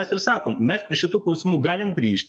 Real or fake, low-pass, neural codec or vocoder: fake; 9.9 kHz; codec, 44.1 kHz, 2.6 kbps, SNAC